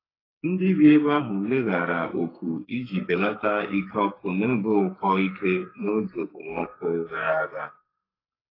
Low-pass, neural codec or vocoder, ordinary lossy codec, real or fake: 5.4 kHz; codec, 44.1 kHz, 2.6 kbps, SNAC; AAC, 24 kbps; fake